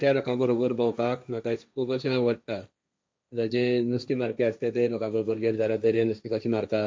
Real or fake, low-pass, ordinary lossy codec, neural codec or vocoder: fake; none; none; codec, 16 kHz, 1.1 kbps, Voila-Tokenizer